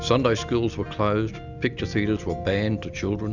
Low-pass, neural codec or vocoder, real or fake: 7.2 kHz; none; real